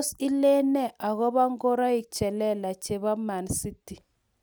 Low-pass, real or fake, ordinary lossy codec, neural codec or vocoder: none; real; none; none